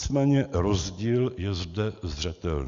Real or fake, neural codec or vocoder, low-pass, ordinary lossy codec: real; none; 7.2 kHz; Opus, 64 kbps